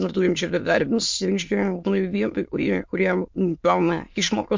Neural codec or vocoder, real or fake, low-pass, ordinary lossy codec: autoencoder, 22.05 kHz, a latent of 192 numbers a frame, VITS, trained on many speakers; fake; 7.2 kHz; MP3, 48 kbps